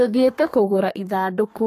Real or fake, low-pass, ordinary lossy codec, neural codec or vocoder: fake; 14.4 kHz; AAC, 48 kbps; codec, 32 kHz, 1.9 kbps, SNAC